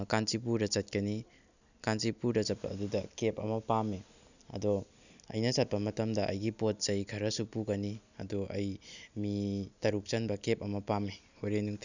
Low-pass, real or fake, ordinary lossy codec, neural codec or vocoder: 7.2 kHz; real; none; none